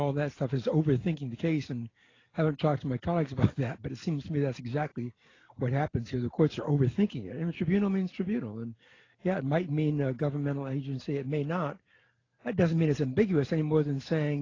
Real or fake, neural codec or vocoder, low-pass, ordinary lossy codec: real; none; 7.2 kHz; AAC, 32 kbps